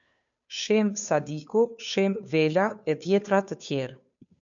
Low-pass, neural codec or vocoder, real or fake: 7.2 kHz; codec, 16 kHz, 2 kbps, FunCodec, trained on Chinese and English, 25 frames a second; fake